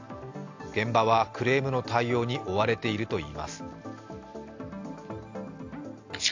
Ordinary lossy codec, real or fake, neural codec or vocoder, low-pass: AAC, 48 kbps; real; none; 7.2 kHz